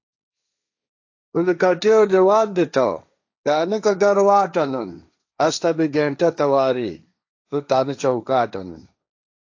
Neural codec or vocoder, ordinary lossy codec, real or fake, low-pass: codec, 16 kHz, 1.1 kbps, Voila-Tokenizer; AAC, 48 kbps; fake; 7.2 kHz